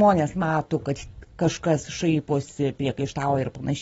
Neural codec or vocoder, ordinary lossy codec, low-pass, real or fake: none; AAC, 24 kbps; 19.8 kHz; real